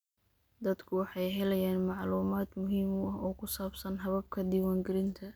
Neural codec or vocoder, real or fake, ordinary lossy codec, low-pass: none; real; none; none